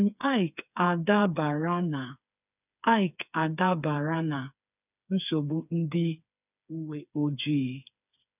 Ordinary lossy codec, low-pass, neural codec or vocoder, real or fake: none; 3.6 kHz; codec, 16 kHz, 4 kbps, FreqCodec, smaller model; fake